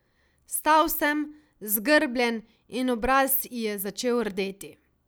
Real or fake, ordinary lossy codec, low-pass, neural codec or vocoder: real; none; none; none